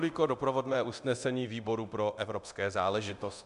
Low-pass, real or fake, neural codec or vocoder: 10.8 kHz; fake; codec, 24 kHz, 0.9 kbps, DualCodec